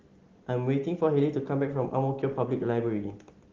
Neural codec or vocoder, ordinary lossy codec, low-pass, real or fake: none; Opus, 16 kbps; 7.2 kHz; real